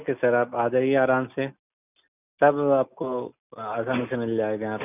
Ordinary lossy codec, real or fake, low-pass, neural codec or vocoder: none; real; 3.6 kHz; none